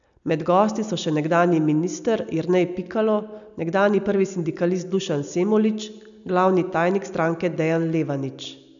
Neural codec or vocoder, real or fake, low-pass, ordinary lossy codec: none; real; 7.2 kHz; none